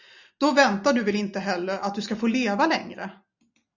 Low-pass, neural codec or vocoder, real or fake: 7.2 kHz; none; real